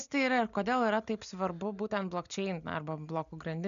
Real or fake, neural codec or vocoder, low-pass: real; none; 7.2 kHz